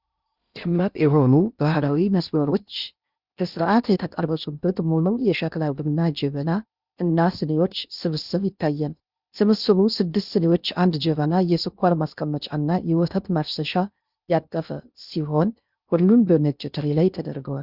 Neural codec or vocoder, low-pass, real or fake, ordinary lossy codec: codec, 16 kHz in and 24 kHz out, 0.6 kbps, FocalCodec, streaming, 2048 codes; 5.4 kHz; fake; Opus, 64 kbps